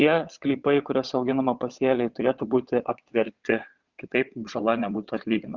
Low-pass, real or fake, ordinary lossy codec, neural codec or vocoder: 7.2 kHz; fake; Opus, 64 kbps; vocoder, 22.05 kHz, 80 mel bands, WaveNeXt